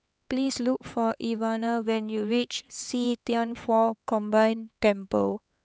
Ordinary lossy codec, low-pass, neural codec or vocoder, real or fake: none; none; codec, 16 kHz, 4 kbps, X-Codec, HuBERT features, trained on LibriSpeech; fake